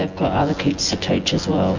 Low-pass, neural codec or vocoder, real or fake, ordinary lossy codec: 7.2 kHz; vocoder, 24 kHz, 100 mel bands, Vocos; fake; MP3, 48 kbps